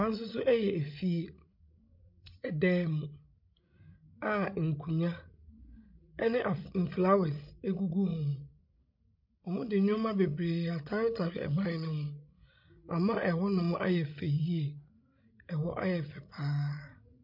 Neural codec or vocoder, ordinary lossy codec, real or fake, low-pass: codec, 16 kHz, 16 kbps, FreqCodec, larger model; MP3, 48 kbps; fake; 5.4 kHz